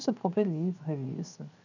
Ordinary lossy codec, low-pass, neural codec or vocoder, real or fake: none; 7.2 kHz; codec, 16 kHz, 0.7 kbps, FocalCodec; fake